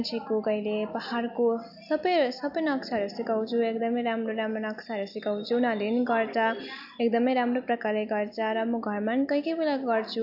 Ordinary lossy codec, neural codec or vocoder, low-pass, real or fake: AAC, 48 kbps; none; 5.4 kHz; real